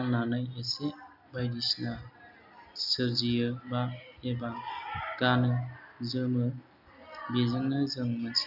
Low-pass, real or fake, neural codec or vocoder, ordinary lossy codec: 5.4 kHz; real; none; none